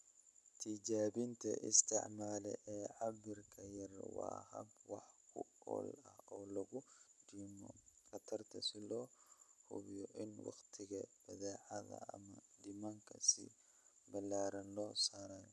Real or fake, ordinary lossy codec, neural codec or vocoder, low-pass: real; none; none; none